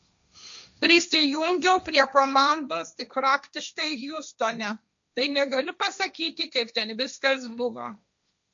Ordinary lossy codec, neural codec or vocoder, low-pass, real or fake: MP3, 64 kbps; codec, 16 kHz, 1.1 kbps, Voila-Tokenizer; 7.2 kHz; fake